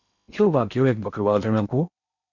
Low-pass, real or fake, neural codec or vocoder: 7.2 kHz; fake; codec, 16 kHz in and 24 kHz out, 0.8 kbps, FocalCodec, streaming, 65536 codes